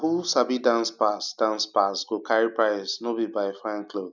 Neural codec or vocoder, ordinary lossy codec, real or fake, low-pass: none; none; real; 7.2 kHz